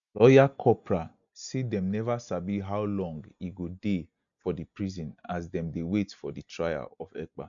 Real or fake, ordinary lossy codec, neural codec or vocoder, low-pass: real; none; none; 7.2 kHz